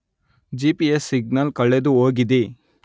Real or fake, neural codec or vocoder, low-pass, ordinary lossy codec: real; none; none; none